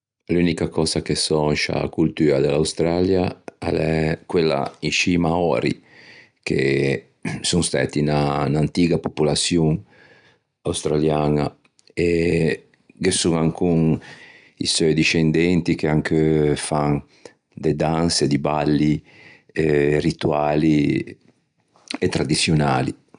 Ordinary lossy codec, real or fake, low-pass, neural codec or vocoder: none; real; 9.9 kHz; none